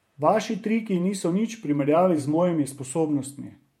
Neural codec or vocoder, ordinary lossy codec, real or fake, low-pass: vocoder, 48 kHz, 128 mel bands, Vocos; MP3, 64 kbps; fake; 19.8 kHz